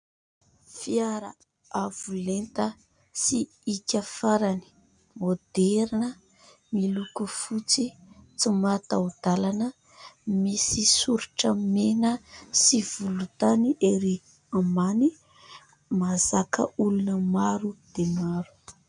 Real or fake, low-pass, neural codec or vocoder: real; 9.9 kHz; none